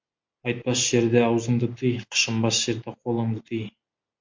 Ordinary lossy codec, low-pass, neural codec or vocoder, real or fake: MP3, 48 kbps; 7.2 kHz; none; real